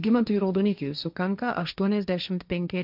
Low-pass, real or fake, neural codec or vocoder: 5.4 kHz; fake; codec, 16 kHz, 1.1 kbps, Voila-Tokenizer